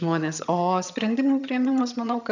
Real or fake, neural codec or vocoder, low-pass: fake; vocoder, 22.05 kHz, 80 mel bands, HiFi-GAN; 7.2 kHz